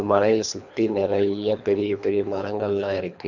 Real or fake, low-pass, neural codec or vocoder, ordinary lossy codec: fake; 7.2 kHz; codec, 24 kHz, 3 kbps, HILCodec; none